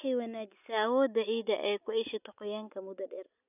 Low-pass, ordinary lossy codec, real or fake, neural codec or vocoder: 3.6 kHz; none; real; none